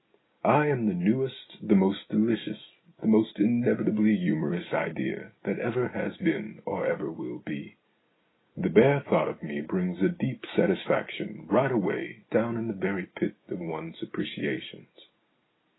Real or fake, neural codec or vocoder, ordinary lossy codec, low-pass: real; none; AAC, 16 kbps; 7.2 kHz